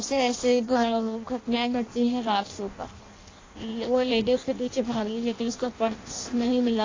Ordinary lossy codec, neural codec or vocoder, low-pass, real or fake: AAC, 32 kbps; codec, 16 kHz in and 24 kHz out, 0.6 kbps, FireRedTTS-2 codec; 7.2 kHz; fake